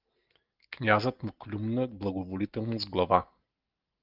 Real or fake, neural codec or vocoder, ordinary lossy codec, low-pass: real; none; Opus, 32 kbps; 5.4 kHz